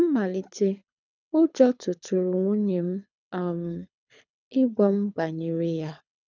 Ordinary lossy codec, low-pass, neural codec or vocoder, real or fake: none; 7.2 kHz; codec, 24 kHz, 6 kbps, HILCodec; fake